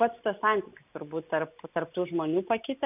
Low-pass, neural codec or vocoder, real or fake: 3.6 kHz; none; real